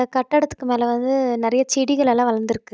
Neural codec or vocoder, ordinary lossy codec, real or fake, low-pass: none; none; real; none